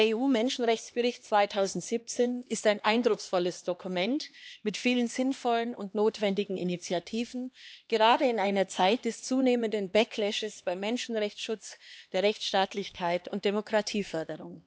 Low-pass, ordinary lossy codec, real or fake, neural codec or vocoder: none; none; fake; codec, 16 kHz, 2 kbps, X-Codec, HuBERT features, trained on LibriSpeech